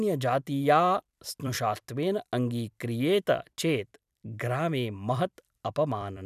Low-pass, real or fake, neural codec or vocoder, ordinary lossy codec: 14.4 kHz; fake; vocoder, 44.1 kHz, 128 mel bands every 256 samples, BigVGAN v2; none